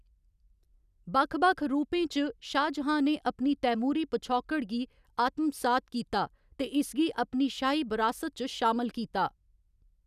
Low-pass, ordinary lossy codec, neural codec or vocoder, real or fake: 14.4 kHz; none; none; real